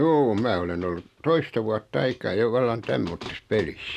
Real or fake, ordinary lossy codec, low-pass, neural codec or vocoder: real; none; 14.4 kHz; none